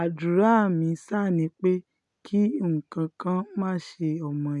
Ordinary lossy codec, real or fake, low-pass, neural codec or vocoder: none; real; 10.8 kHz; none